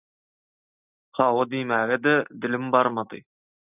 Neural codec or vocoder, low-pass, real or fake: none; 3.6 kHz; real